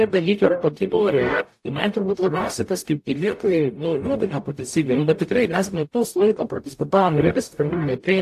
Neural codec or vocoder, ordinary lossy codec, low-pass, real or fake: codec, 44.1 kHz, 0.9 kbps, DAC; AAC, 64 kbps; 14.4 kHz; fake